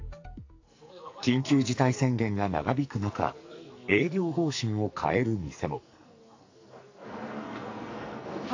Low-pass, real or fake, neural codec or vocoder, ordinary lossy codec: 7.2 kHz; fake; codec, 44.1 kHz, 2.6 kbps, SNAC; AAC, 48 kbps